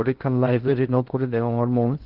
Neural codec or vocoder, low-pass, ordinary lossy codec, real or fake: codec, 16 kHz in and 24 kHz out, 0.6 kbps, FocalCodec, streaming, 2048 codes; 5.4 kHz; Opus, 16 kbps; fake